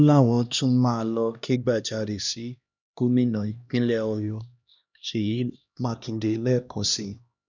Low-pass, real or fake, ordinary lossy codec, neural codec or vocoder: 7.2 kHz; fake; none; codec, 16 kHz, 1 kbps, X-Codec, HuBERT features, trained on LibriSpeech